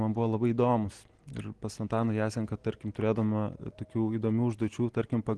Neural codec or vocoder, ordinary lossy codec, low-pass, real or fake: none; Opus, 16 kbps; 10.8 kHz; real